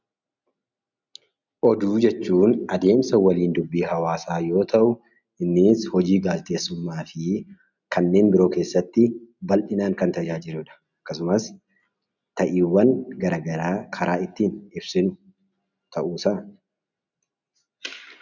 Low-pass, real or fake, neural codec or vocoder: 7.2 kHz; real; none